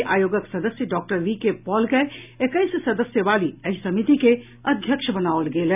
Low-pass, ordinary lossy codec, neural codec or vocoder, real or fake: 3.6 kHz; none; none; real